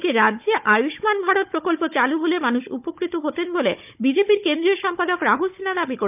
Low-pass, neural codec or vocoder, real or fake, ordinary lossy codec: 3.6 kHz; codec, 16 kHz, 16 kbps, FunCodec, trained on Chinese and English, 50 frames a second; fake; none